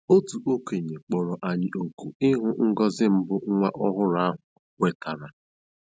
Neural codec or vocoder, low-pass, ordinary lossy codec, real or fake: none; none; none; real